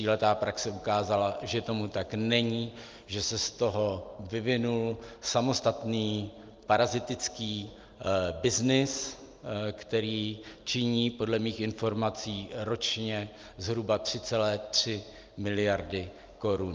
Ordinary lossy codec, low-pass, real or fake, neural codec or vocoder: Opus, 24 kbps; 7.2 kHz; real; none